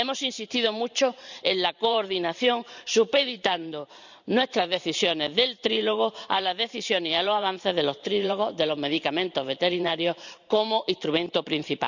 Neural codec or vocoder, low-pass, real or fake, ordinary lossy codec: vocoder, 44.1 kHz, 128 mel bands every 512 samples, BigVGAN v2; 7.2 kHz; fake; none